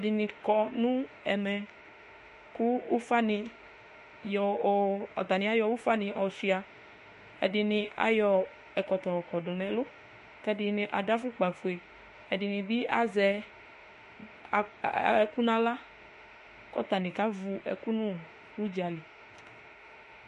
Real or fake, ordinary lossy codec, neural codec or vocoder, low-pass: fake; MP3, 48 kbps; autoencoder, 48 kHz, 32 numbers a frame, DAC-VAE, trained on Japanese speech; 14.4 kHz